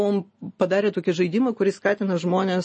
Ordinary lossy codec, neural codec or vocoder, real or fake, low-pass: MP3, 32 kbps; none; real; 9.9 kHz